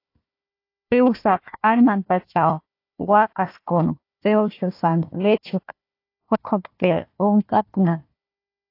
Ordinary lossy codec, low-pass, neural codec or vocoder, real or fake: AAC, 32 kbps; 5.4 kHz; codec, 16 kHz, 1 kbps, FunCodec, trained on Chinese and English, 50 frames a second; fake